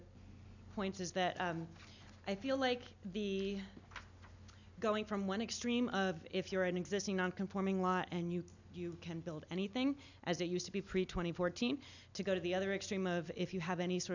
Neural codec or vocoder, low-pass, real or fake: none; 7.2 kHz; real